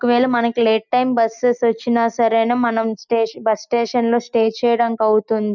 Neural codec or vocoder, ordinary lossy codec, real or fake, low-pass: none; none; real; 7.2 kHz